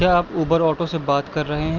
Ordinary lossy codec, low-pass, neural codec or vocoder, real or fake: Opus, 24 kbps; 7.2 kHz; none; real